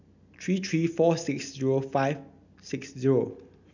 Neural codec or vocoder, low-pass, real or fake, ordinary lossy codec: none; 7.2 kHz; real; none